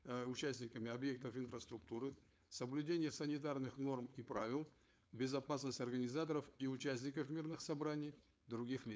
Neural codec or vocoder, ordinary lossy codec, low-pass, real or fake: codec, 16 kHz, 4.8 kbps, FACodec; none; none; fake